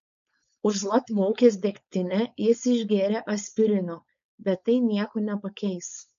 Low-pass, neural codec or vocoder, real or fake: 7.2 kHz; codec, 16 kHz, 4.8 kbps, FACodec; fake